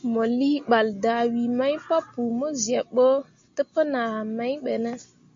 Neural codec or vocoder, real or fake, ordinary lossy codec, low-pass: none; real; MP3, 48 kbps; 7.2 kHz